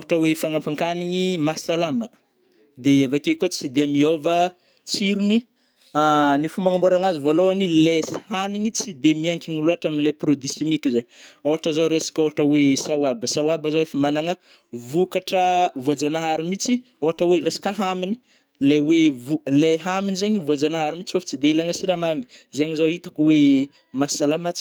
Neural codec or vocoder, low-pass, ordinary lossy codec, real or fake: codec, 44.1 kHz, 2.6 kbps, SNAC; none; none; fake